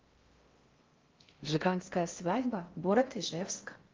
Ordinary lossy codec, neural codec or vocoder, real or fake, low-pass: Opus, 32 kbps; codec, 16 kHz in and 24 kHz out, 0.6 kbps, FocalCodec, streaming, 4096 codes; fake; 7.2 kHz